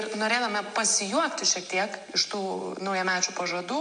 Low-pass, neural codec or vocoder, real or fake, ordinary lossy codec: 9.9 kHz; none; real; MP3, 64 kbps